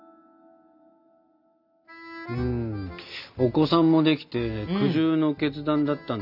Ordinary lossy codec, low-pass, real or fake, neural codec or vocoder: none; 5.4 kHz; real; none